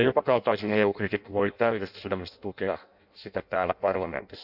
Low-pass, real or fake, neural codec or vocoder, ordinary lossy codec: 5.4 kHz; fake; codec, 16 kHz in and 24 kHz out, 0.6 kbps, FireRedTTS-2 codec; none